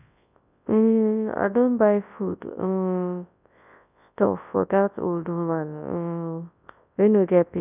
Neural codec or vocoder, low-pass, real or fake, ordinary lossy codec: codec, 24 kHz, 0.9 kbps, WavTokenizer, large speech release; 3.6 kHz; fake; none